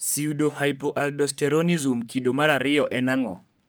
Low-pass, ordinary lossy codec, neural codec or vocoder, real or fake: none; none; codec, 44.1 kHz, 3.4 kbps, Pupu-Codec; fake